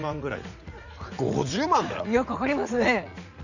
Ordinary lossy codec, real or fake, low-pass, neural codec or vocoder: none; fake; 7.2 kHz; vocoder, 44.1 kHz, 80 mel bands, Vocos